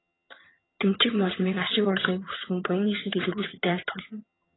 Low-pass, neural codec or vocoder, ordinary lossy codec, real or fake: 7.2 kHz; vocoder, 22.05 kHz, 80 mel bands, HiFi-GAN; AAC, 16 kbps; fake